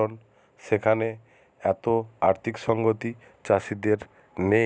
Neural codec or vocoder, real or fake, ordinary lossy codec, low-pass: none; real; none; none